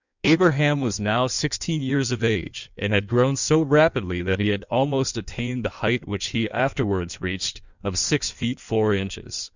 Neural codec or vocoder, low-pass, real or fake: codec, 16 kHz in and 24 kHz out, 1.1 kbps, FireRedTTS-2 codec; 7.2 kHz; fake